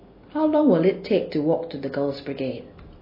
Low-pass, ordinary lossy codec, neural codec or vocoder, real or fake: 5.4 kHz; MP3, 24 kbps; none; real